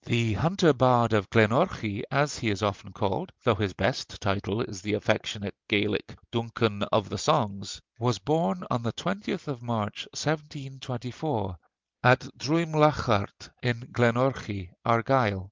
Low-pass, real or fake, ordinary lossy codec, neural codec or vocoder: 7.2 kHz; real; Opus, 32 kbps; none